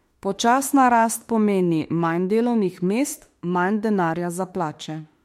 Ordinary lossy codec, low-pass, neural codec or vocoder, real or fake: MP3, 64 kbps; 19.8 kHz; autoencoder, 48 kHz, 32 numbers a frame, DAC-VAE, trained on Japanese speech; fake